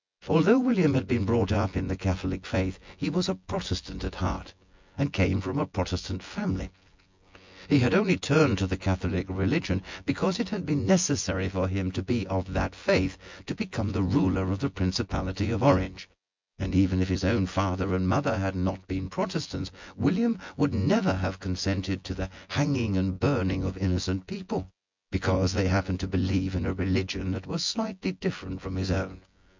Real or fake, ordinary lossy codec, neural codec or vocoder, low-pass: fake; MP3, 64 kbps; vocoder, 24 kHz, 100 mel bands, Vocos; 7.2 kHz